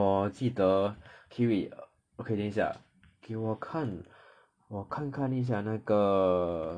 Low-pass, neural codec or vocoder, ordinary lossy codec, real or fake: 9.9 kHz; none; MP3, 96 kbps; real